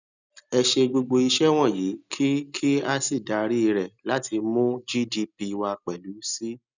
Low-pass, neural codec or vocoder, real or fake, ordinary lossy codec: 7.2 kHz; none; real; none